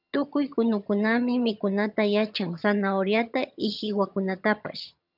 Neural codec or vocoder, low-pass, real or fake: vocoder, 22.05 kHz, 80 mel bands, HiFi-GAN; 5.4 kHz; fake